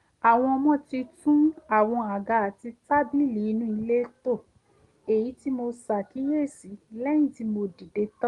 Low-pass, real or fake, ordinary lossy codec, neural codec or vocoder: 10.8 kHz; real; Opus, 24 kbps; none